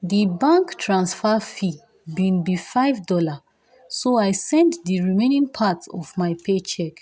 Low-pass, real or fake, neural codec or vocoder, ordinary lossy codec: none; real; none; none